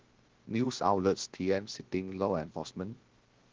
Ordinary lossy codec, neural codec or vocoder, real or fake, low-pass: Opus, 16 kbps; codec, 16 kHz, about 1 kbps, DyCAST, with the encoder's durations; fake; 7.2 kHz